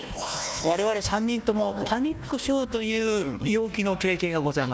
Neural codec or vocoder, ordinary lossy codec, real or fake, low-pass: codec, 16 kHz, 1 kbps, FunCodec, trained on Chinese and English, 50 frames a second; none; fake; none